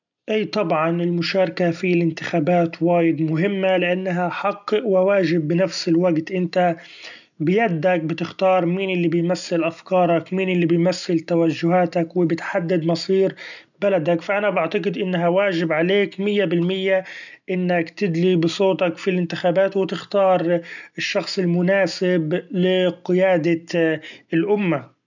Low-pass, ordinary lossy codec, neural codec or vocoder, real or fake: 7.2 kHz; none; none; real